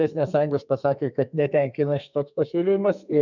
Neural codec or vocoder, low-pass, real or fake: codec, 32 kHz, 1.9 kbps, SNAC; 7.2 kHz; fake